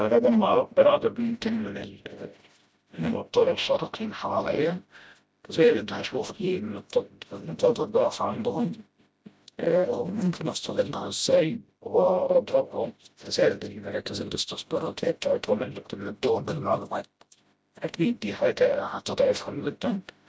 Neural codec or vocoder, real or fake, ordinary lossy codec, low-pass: codec, 16 kHz, 0.5 kbps, FreqCodec, smaller model; fake; none; none